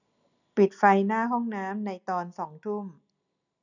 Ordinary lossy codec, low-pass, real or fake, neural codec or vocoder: none; 7.2 kHz; real; none